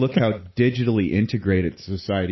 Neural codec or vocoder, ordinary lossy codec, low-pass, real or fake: none; MP3, 24 kbps; 7.2 kHz; real